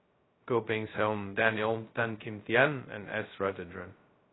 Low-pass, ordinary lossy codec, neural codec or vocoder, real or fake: 7.2 kHz; AAC, 16 kbps; codec, 16 kHz, 0.2 kbps, FocalCodec; fake